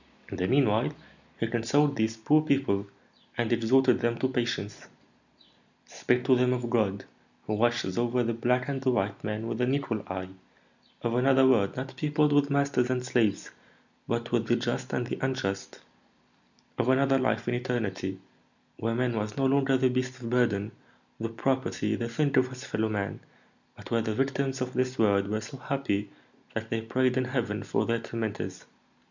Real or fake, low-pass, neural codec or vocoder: real; 7.2 kHz; none